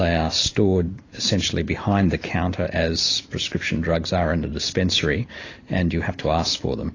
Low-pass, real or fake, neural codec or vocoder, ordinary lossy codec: 7.2 kHz; real; none; AAC, 32 kbps